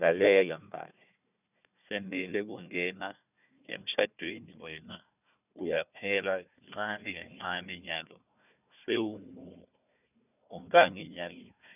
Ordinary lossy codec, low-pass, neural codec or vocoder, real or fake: none; 3.6 kHz; codec, 16 kHz, 1 kbps, FunCodec, trained on Chinese and English, 50 frames a second; fake